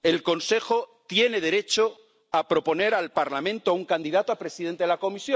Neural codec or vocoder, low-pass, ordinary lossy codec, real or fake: none; none; none; real